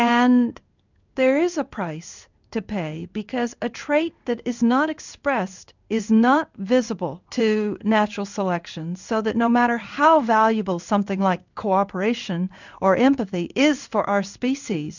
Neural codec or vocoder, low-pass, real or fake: codec, 16 kHz in and 24 kHz out, 1 kbps, XY-Tokenizer; 7.2 kHz; fake